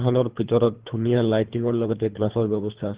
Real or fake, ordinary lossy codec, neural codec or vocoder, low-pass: fake; Opus, 16 kbps; codec, 24 kHz, 3 kbps, HILCodec; 3.6 kHz